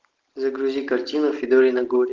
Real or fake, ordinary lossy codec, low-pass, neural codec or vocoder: real; Opus, 32 kbps; 7.2 kHz; none